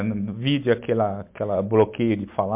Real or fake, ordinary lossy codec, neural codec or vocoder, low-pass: real; MP3, 32 kbps; none; 3.6 kHz